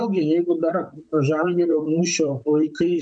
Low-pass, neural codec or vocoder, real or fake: 9.9 kHz; vocoder, 44.1 kHz, 128 mel bands, Pupu-Vocoder; fake